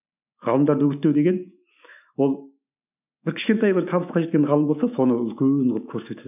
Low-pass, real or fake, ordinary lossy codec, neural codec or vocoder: 3.6 kHz; fake; none; autoencoder, 48 kHz, 128 numbers a frame, DAC-VAE, trained on Japanese speech